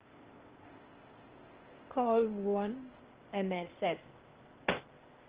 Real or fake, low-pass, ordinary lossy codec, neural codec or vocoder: fake; 3.6 kHz; Opus, 16 kbps; codec, 16 kHz, 0.8 kbps, ZipCodec